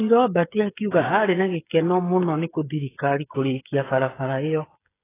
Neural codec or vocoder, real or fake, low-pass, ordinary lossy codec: codec, 16 kHz, 8 kbps, FreqCodec, smaller model; fake; 3.6 kHz; AAC, 16 kbps